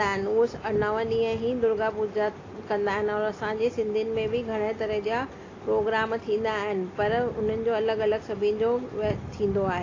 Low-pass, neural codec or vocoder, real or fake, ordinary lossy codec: 7.2 kHz; none; real; AAC, 32 kbps